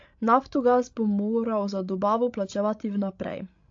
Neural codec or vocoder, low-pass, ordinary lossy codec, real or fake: codec, 16 kHz, 16 kbps, FreqCodec, larger model; 7.2 kHz; AAC, 48 kbps; fake